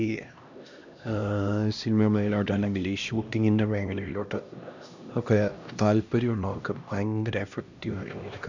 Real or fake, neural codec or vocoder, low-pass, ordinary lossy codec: fake; codec, 16 kHz, 1 kbps, X-Codec, HuBERT features, trained on LibriSpeech; 7.2 kHz; none